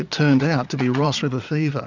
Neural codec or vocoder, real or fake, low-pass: vocoder, 22.05 kHz, 80 mel bands, Vocos; fake; 7.2 kHz